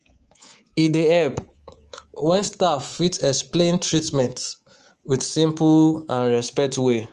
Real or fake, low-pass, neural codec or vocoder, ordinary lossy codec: fake; 10.8 kHz; codec, 24 kHz, 3.1 kbps, DualCodec; Opus, 24 kbps